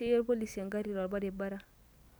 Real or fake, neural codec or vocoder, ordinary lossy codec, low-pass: real; none; none; none